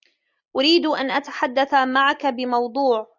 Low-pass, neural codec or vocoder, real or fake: 7.2 kHz; none; real